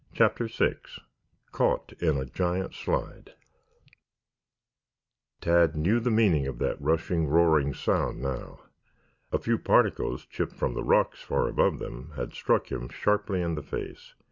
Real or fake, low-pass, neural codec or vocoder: real; 7.2 kHz; none